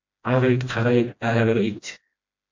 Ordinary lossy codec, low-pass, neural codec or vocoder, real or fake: MP3, 48 kbps; 7.2 kHz; codec, 16 kHz, 1 kbps, FreqCodec, smaller model; fake